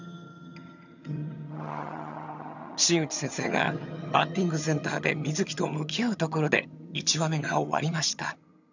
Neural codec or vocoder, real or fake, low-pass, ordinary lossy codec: vocoder, 22.05 kHz, 80 mel bands, HiFi-GAN; fake; 7.2 kHz; none